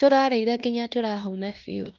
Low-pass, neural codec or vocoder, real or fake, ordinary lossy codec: 7.2 kHz; codec, 16 kHz in and 24 kHz out, 0.9 kbps, LongCat-Audio-Codec, fine tuned four codebook decoder; fake; Opus, 24 kbps